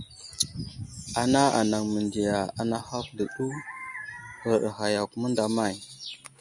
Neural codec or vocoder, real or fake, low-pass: none; real; 10.8 kHz